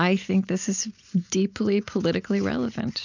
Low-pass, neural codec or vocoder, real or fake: 7.2 kHz; none; real